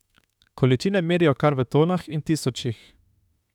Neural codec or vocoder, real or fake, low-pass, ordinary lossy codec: autoencoder, 48 kHz, 32 numbers a frame, DAC-VAE, trained on Japanese speech; fake; 19.8 kHz; none